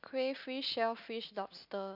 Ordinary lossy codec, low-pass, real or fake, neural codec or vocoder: none; 5.4 kHz; real; none